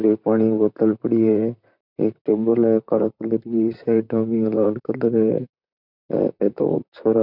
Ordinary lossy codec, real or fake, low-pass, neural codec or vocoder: none; fake; 5.4 kHz; vocoder, 44.1 kHz, 128 mel bands, Pupu-Vocoder